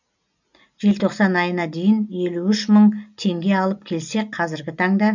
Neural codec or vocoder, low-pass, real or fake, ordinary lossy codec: none; 7.2 kHz; real; none